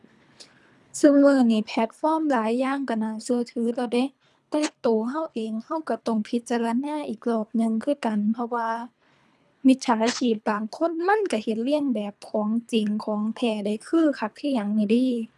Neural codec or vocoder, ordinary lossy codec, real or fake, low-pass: codec, 24 kHz, 3 kbps, HILCodec; none; fake; none